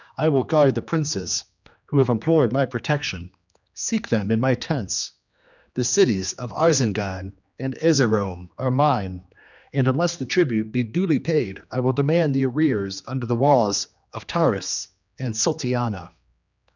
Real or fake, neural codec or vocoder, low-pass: fake; codec, 16 kHz, 2 kbps, X-Codec, HuBERT features, trained on general audio; 7.2 kHz